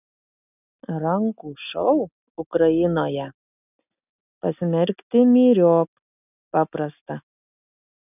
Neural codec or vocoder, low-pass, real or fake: none; 3.6 kHz; real